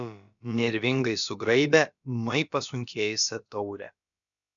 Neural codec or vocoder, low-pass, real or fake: codec, 16 kHz, about 1 kbps, DyCAST, with the encoder's durations; 7.2 kHz; fake